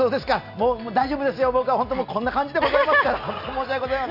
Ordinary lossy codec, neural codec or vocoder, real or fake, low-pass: none; none; real; 5.4 kHz